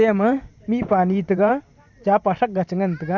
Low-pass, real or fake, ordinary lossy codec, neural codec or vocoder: 7.2 kHz; fake; none; vocoder, 44.1 kHz, 128 mel bands every 512 samples, BigVGAN v2